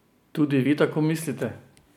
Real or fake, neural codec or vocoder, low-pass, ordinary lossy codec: real; none; 19.8 kHz; none